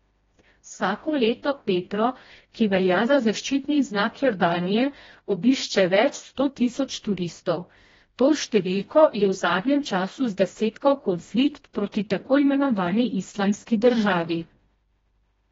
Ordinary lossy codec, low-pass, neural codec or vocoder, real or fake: AAC, 24 kbps; 7.2 kHz; codec, 16 kHz, 1 kbps, FreqCodec, smaller model; fake